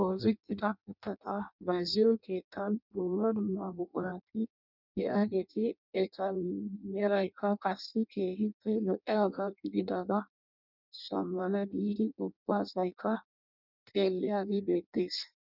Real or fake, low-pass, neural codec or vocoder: fake; 5.4 kHz; codec, 16 kHz in and 24 kHz out, 0.6 kbps, FireRedTTS-2 codec